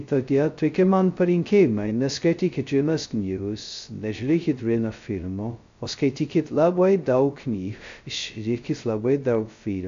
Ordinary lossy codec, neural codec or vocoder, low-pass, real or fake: AAC, 64 kbps; codec, 16 kHz, 0.2 kbps, FocalCodec; 7.2 kHz; fake